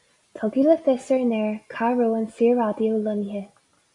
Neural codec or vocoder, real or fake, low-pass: none; real; 10.8 kHz